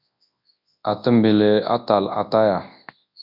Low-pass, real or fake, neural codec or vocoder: 5.4 kHz; fake; codec, 24 kHz, 0.9 kbps, WavTokenizer, large speech release